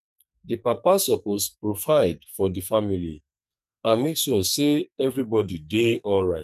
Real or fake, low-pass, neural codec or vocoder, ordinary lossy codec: fake; 14.4 kHz; codec, 44.1 kHz, 2.6 kbps, SNAC; none